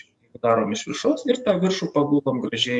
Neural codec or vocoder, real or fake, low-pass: codec, 44.1 kHz, 7.8 kbps, DAC; fake; 10.8 kHz